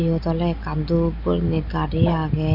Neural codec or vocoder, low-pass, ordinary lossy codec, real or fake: none; 5.4 kHz; none; real